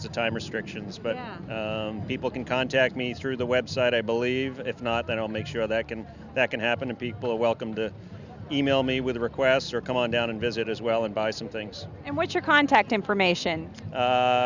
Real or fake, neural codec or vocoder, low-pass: real; none; 7.2 kHz